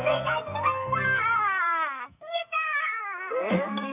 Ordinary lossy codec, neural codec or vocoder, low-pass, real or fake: none; codec, 44.1 kHz, 2.6 kbps, SNAC; 3.6 kHz; fake